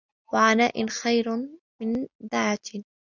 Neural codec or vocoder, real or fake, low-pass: none; real; 7.2 kHz